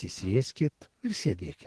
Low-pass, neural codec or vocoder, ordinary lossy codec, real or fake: 10.8 kHz; codec, 44.1 kHz, 2.6 kbps, SNAC; Opus, 16 kbps; fake